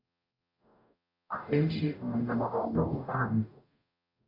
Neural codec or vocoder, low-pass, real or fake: codec, 44.1 kHz, 0.9 kbps, DAC; 5.4 kHz; fake